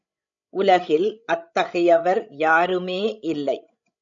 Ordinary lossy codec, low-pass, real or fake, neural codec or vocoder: MP3, 96 kbps; 7.2 kHz; fake; codec, 16 kHz, 16 kbps, FreqCodec, larger model